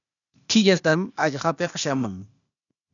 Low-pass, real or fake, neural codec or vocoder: 7.2 kHz; fake; codec, 16 kHz, 0.8 kbps, ZipCodec